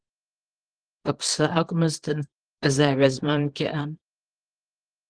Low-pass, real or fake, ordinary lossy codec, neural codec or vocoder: 9.9 kHz; fake; Opus, 16 kbps; codec, 24 kHz, 0.9 kbps, WavTokenizer, small release